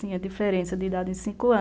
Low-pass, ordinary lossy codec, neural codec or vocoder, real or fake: none; none; none; real